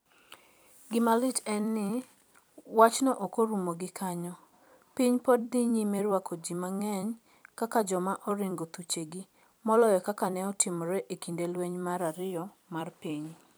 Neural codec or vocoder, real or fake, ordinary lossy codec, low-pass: vocoder, 44.1 kHz, 128 mel bands every 256 samples, BigVGAN v2; fake; none; none